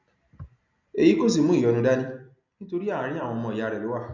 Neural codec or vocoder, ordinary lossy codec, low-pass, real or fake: none; none; 7.2 kHz; real